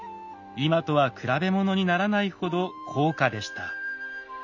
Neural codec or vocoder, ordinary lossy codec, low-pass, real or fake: none; none; 7.2 kHz; real